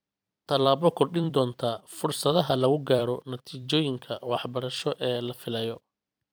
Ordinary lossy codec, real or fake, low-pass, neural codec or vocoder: none; fake; none; vocoder, 44.1 kHz, 128 mel bands every 256 samples, BigVGAN v2